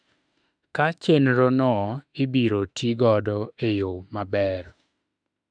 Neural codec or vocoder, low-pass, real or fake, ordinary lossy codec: autoencoder, 48 kHz, 32 numbers a frame, DAC-VAE, trained on Japanese speech; 9.9 kHz; fake; none